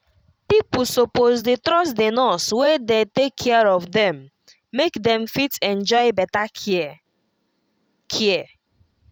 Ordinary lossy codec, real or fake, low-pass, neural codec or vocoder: none; fake; 19.8 kHz; vocoder, 44.1 kHz, 128 mel bands every 512 samples, BigVGAN v2